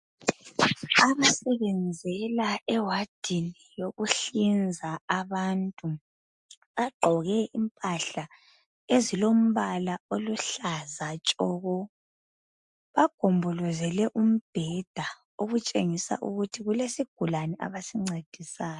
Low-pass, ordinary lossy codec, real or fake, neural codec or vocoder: 10.8 kHz; MP3, 64 kbps; real; none